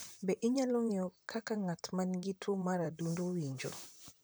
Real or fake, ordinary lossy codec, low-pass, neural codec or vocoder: fake; none; none; vocoder, 44.1 kHz, 128 mel bands, Pupu-Vocoder